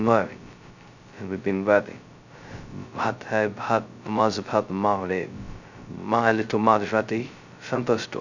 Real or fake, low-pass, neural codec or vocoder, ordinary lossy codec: fake; 7.2 kHz; codec, 16 kHz, 0.2 kbps, FocalCodec; none